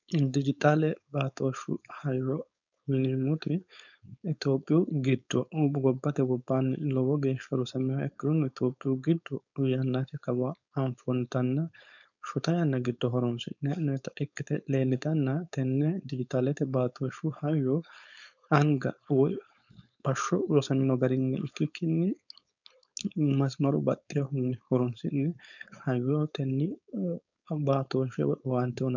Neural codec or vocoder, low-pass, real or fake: codec, 16 kHz, 4.8 kbps, FACodec; 7.2 kHz; fake